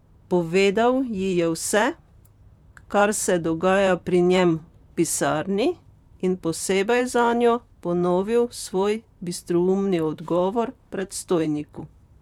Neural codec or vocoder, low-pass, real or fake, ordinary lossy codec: vocoder, 44.1 kHz, 128 mel bands, Pupu-Vocoder; 19.8 kHz; fake; none